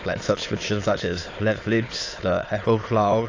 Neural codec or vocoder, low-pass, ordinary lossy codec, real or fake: autoencoder, 22.05 kHz, a latent of 192 numbers a frame, VITS, trained on many speakers; 7.2 kHz; AAC, 32 kbps; fake